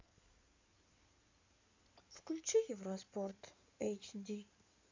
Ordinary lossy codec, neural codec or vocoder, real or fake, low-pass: MP3, 64 kbps; codec, 16 kHz in and 24 kHz out, 2.2 kbps, FireRedTTS-2 codec; fake; 7.2 kHz